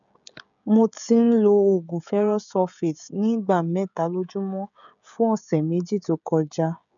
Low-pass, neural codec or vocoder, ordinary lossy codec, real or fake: 7.2 kHz; codec, 16 kHz, 16 kbps, FreqCodec, smaller model; none; fake